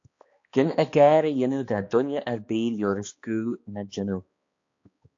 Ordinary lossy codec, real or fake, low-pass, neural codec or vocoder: AAC, 48 kbps; fake; 7.2 kHz; codec, 16 kHz, 2 kbps, X-Codec, HuBERT features, trained on balanced general audio